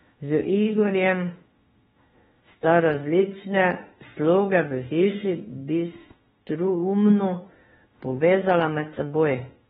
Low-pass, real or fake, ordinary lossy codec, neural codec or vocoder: 19.8 kHz; fake; AAC, 16 kbps; autoencoder, 48 kHz, 32 numbers a frame, DAC-VAE, trained on Japanese speech